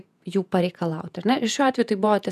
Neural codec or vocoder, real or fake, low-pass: vocoder, 48 kHz, 128 mel bands, Vocos; fake; 14.4 kHz